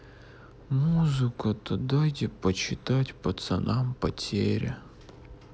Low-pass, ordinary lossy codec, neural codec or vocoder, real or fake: none; none; none; real